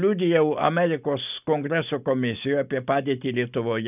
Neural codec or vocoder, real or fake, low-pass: none; real; 3.6 kHz